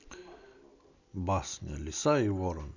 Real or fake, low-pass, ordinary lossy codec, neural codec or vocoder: real; 7.2 kHz; none; none